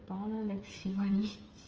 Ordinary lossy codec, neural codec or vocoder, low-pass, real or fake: Opus, 24 kbps; codec, 16 kHz, 8 kbps, FreqCodec, smaller model; 7.2 kHz; fake